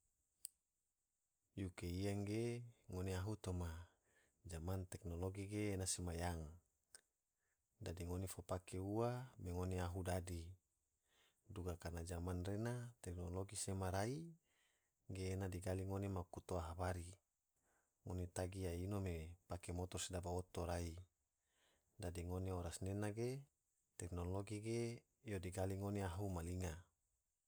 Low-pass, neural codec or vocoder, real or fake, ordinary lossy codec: none; none; real; none